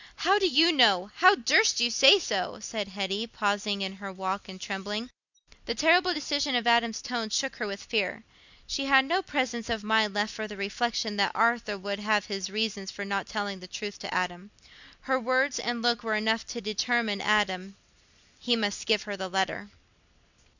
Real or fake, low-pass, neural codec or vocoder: real; 7.2 kHz; none